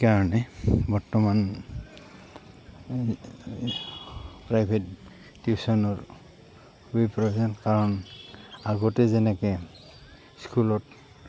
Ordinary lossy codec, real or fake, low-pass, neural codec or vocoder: none; real; none; none